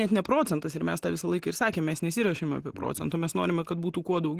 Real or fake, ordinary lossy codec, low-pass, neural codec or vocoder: real; Opus, 16 kbps; 14.4 kHz; none